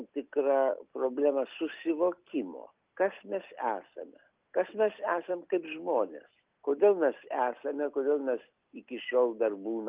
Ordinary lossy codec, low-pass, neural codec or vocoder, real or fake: Opus, 32 kbps; 3.6 kHz; none; real